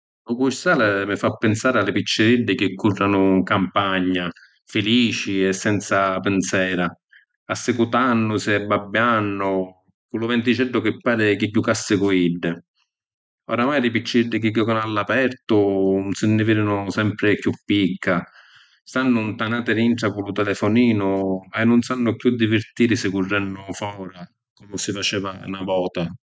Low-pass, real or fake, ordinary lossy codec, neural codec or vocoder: none; real; none; none